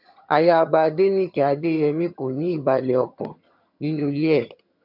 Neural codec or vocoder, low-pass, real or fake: vocoder, 22.05 kHz, 80 mel bands, HiFi-GAN; 5.4 kHz; fake